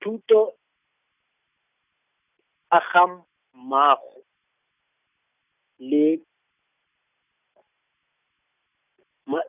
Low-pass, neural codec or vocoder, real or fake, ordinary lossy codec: 3.6 kHz; none; real; none